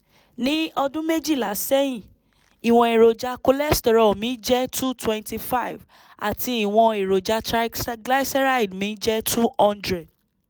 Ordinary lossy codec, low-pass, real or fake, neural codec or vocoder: none; none; real; none